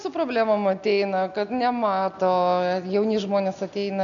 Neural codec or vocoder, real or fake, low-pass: none; real; 7.2 kHz